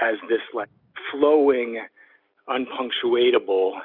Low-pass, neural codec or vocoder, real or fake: 5.4 kHz; none; real